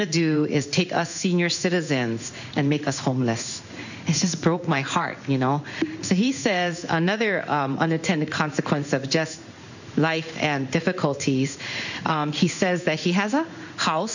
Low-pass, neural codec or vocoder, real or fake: 7.2 kHz; codec, 16 kHz in and 24 kHz out, 1 kbps, XY-Tokenizer; fake